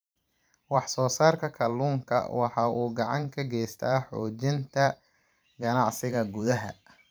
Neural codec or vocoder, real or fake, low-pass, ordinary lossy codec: vocoder, 44.1 kHz, 128 mel bands every 512 samples, BigVGAN v2; fake; none; none